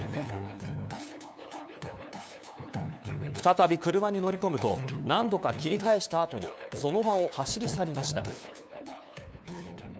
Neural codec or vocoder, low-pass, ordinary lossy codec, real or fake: codec, 16 kHz, 2 kbps, FunCodec, trained on LibriTTS, 25 frames a second; none; none; fake